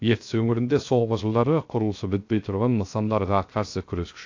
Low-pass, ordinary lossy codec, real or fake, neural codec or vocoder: 7.2 kHz; AAC, 48 kbps; fake; codec, 16 kHz, 0.3 kbps, FocalCodec